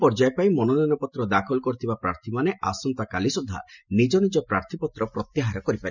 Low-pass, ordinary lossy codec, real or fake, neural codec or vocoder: 7.2 kHz; none; real; none